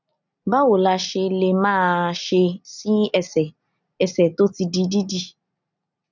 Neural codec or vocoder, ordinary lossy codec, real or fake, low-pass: none; none; real; 7.2 kHz